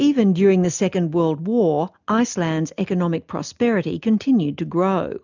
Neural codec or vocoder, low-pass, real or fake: none; 7.2 kHz; real